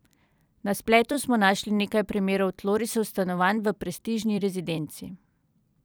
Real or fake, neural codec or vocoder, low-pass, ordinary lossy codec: fake; vocoder, 44.1 kHz, 128 mel bands every 256 samples, BigVGAN v2; none; none